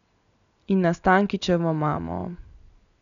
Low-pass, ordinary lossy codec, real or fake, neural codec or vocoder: 7.2 kHz; none; real; none